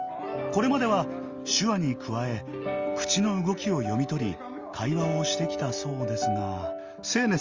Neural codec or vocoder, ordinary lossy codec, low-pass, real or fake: none; Opus, 32 kbps; 7.2 kHz; real